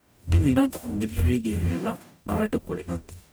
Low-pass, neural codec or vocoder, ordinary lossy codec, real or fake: none; codec, 44.1 kHz, 0.9 kbps, DAC; none; fake